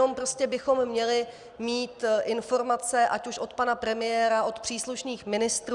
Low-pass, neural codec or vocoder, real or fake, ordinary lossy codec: 10.8 kHz; none; real; Opus, 64 kbps